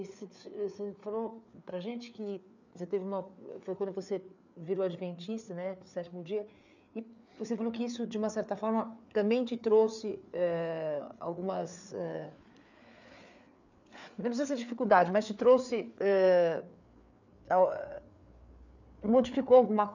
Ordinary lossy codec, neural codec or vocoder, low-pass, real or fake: none; codec, 16 kHz, 4 kbps, FreqCodec, larger model; 7.2 kHz; fake